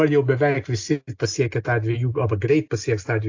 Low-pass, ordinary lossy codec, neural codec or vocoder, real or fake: 7.2 kHz; AAC, 48 kbps; none; real